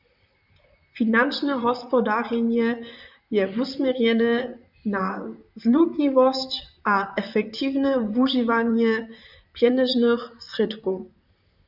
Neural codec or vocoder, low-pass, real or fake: vocoder, 44.1 kHz, 128 mel bands, Pupu-Vocoder; 5.4 kHz; fake